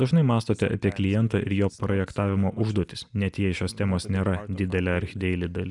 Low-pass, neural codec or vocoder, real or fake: 10.8 kHz; none; real